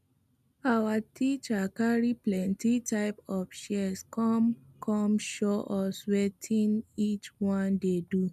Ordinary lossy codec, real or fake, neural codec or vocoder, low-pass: none; real; none; 14.4 kHz